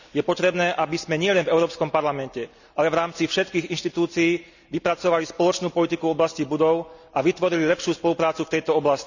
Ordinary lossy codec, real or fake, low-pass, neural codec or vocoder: none; real; 7.2 kHz; none